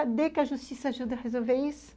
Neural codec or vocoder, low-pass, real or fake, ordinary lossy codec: none; none; real; none